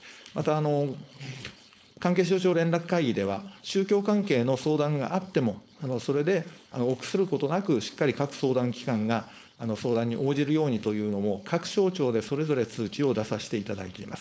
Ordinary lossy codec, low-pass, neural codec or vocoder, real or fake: none; none; codec, 16 kHz, 4.8 kbps, FACodec; fake